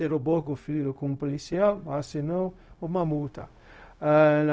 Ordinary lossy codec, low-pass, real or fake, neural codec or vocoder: none; none; fake; codec, 16 kHz, 0.4 kbps, LongCat-Audio-Codec